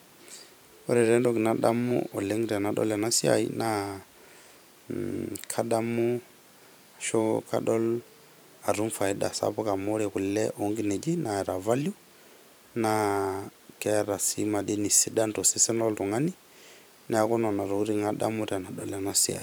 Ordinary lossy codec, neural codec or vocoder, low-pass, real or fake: none; vocoder, 44.1 kHz, 128 mel bands every 512 samples, BigVGAN v2; none; fake